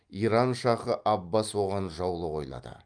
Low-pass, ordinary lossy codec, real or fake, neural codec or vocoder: 9.9 kHz; Opus, 32 kbps; real; none